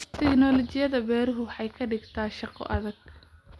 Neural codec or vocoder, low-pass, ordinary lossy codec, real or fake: none; none; none; real